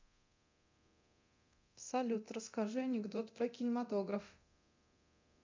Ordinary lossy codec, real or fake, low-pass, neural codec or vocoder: MP3, 64 kbps; fake; 7.2 kHz; codec, 24 kHz, 0.9 kbps, DualCodec